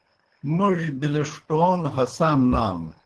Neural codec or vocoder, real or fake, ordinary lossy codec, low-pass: codec, 24 kHz, 3 kbps, HILCodec; fake; Opus, 16 kbps; 10.8 kHz